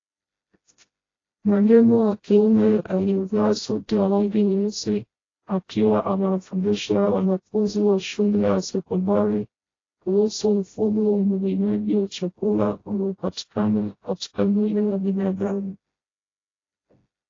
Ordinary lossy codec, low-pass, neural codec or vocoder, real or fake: AAC, 32 kbps; 7.2 kHz; codec, 16 kHz, 0.5 kbps, FreqCodec, smaller model; fake